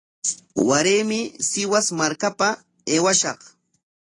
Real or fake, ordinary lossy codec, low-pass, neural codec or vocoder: real; AAC, 32 kbps; 10.8 kHz; none